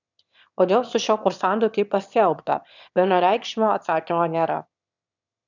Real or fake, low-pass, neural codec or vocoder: fake; 7.2 kHz; autoencoder, 22.05 kHz, a latent of 192 numbers a frame, VITS, trained on one speaker